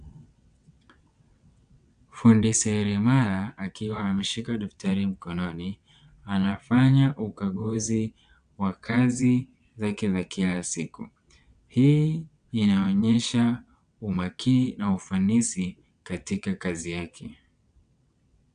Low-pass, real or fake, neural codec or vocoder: 9.9 kHz; fake; vocoder, 22.05 kHz, 80 mel bands, WaveNeXt